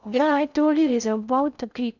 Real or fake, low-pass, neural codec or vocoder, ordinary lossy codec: fake; 7.2 kHz; codec, 16 kHz in and 24 kHz out, 0.6 kbps, FocalCodec, streaming, 2048 codes; none